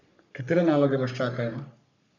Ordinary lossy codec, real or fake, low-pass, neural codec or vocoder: none; fake; 7.2 kHz; codec, 44.1 kHz, 3.4 kbps, Pupu-Codec